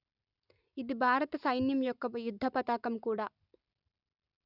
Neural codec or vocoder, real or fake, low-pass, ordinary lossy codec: none; real; 5.4 kHz; none